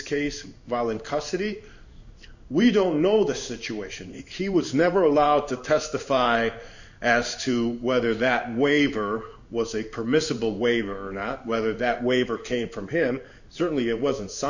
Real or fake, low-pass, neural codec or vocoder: fake; 7.2 kHz; codec, 16 kHz in and 24 kHz out, 1 kbps, XY-Tokenizer